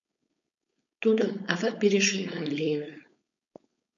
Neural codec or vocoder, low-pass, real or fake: codec, 16 kHz, 4.8 kbps, FACodec; 7.2 kHz; fake